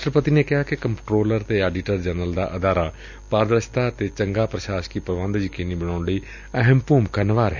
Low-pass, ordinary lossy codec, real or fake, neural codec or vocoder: 7.2 kHz; none; real; none